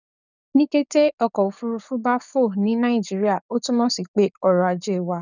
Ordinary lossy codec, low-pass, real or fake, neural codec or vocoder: none; 7.2 kHz; fake; codec, 44.1 kHz, 7.8 kbps, DAC